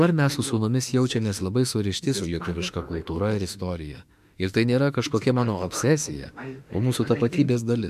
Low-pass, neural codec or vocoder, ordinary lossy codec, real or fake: 14.4 kHz; autoencoder, 48 kHz, 32 numbers a frame, DAC-VAE, trained on Japanese speech; AAC, 96 kbps; fake